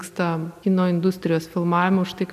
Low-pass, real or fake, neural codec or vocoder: 14.4 kHz; real; none